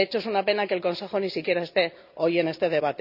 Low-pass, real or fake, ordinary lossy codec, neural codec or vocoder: 5.4 kHz; real; none; none